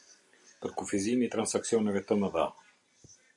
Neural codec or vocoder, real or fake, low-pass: none; real; 10.8 kHz